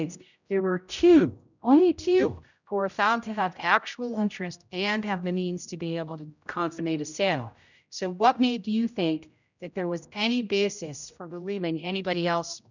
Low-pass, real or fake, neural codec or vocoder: 7.2 kHz; fake; codec, 16 kHz, 0.5 kbps, X-Codec, HuBERT features, trained on general audio